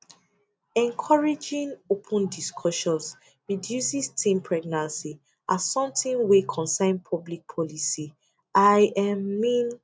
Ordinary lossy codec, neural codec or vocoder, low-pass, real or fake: none; none; none; real